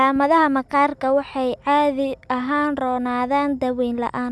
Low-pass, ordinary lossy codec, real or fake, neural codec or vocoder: none; none; real; none